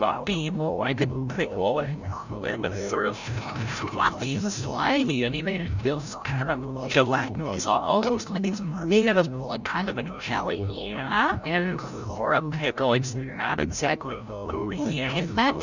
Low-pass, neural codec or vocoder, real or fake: 7.2 kHz; codec, 16 kHz, 0.5 kbps, FreqCodec, larger model; fake